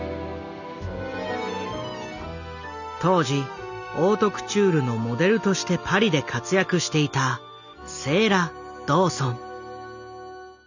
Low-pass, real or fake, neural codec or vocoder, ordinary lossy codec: 7.2 kHz; real; none; none